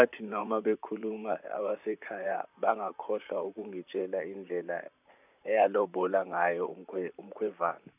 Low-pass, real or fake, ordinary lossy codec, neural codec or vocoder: 3.6 kHz; fake; none; vocoder, 44.1 kHz, 128 mel bands, Pupu-Vocoder